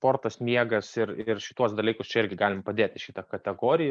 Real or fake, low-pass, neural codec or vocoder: real; 9.9 kHz; none